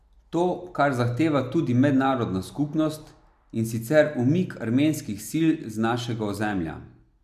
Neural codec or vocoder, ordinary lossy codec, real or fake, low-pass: none; AAC, 96 kbps; real; 14.4 kHz